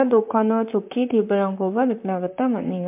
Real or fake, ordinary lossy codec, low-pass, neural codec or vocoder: fake; MP3, 32 kbps; 3.6 kHz; vocoder, 44.1 kHz, 128 mel bands, Pupu-Vocoder